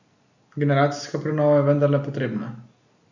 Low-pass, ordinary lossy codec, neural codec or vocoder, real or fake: 7.2 kHz; none; none; real